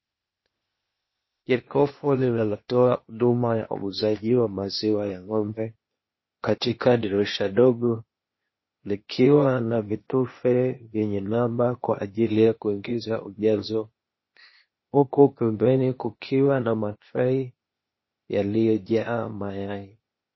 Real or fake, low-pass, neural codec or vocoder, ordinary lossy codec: fake; 7.2 kHz; codec, 16 kHz, 0.8 kbps, ZipCodec; MP3, 24 kbps